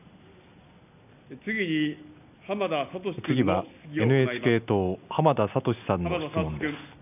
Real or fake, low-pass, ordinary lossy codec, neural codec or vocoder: real; 3.6 kHz; none; none